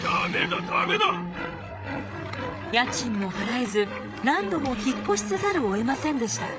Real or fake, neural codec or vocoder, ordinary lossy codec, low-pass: fake; codec, 16 kHz, 8 kbps, FreqCodec, larger model; none; none